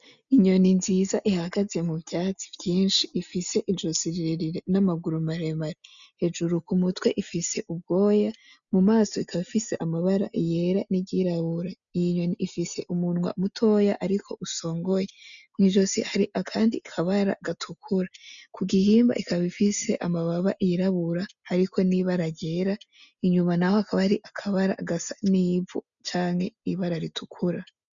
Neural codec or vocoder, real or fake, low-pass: none; real; 7.2 kHz